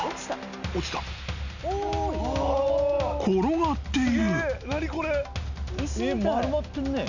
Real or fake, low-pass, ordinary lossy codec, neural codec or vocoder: real; 7.2 kHz; none; none